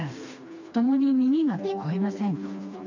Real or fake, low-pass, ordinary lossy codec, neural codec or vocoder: fake; 7.2 kHz; AAC, 48 kbps; codec, 16 kHz, 2 kbps, FreqCodec, smaller model